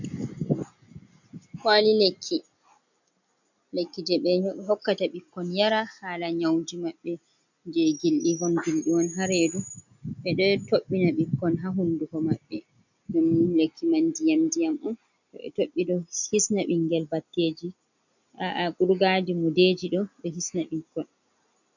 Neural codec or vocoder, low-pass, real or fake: none; 7.2 kHz; real